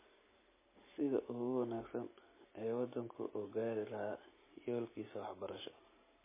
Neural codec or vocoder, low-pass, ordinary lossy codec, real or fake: none; 3.6 kHz; MP3, 16 kbps; real